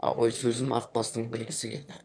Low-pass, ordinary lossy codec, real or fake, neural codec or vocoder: 9.9 kHz; MP3, 96 kbps; fake; autoencoder, 22.05 kHz, a latent of 192 numbers a frame, VITS, trained on one speaker